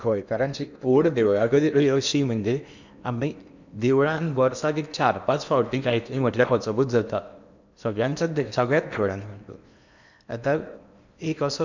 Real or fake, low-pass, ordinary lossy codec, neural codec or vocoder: fake; 7.2 kHz; none; codec, 16 kHz in and 24 kHz out, 0.8 kbps, FocalCodec, streaming, 65536 codes